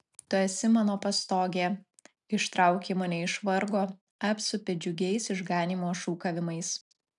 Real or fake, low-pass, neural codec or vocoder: real; 10.8 kHz; none